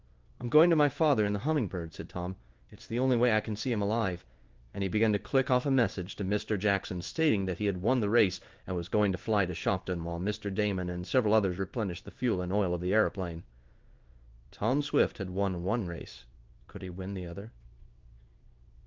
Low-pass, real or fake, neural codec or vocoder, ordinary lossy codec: 7.2 kHz; fake; codec, 16 kHz in and 24 kHz out, 1 kbps, XY-Tokenizer; Opus, 32 kbps